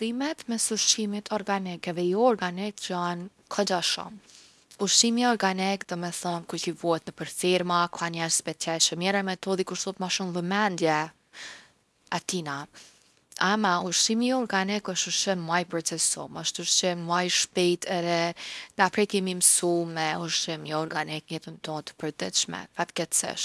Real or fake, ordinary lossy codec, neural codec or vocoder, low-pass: fake; none; codec, 24 kHz, 0.9 kbps, WavTokenizer, small release; none